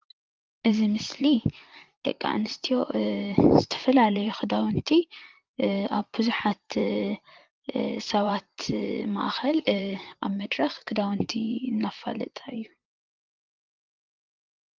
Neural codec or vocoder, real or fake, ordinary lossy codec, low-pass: vocoder, 44.1 kHz, 128 mel bands, Pupu-Vocoder; fake; Opus, 32 kbps; 7.2 kHz